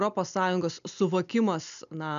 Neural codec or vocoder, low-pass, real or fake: none; 7.2 kHz; real